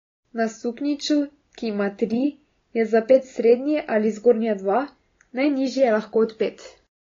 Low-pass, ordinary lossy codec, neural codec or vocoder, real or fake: 7.2 kHz; AAC, 32 kbps; none; real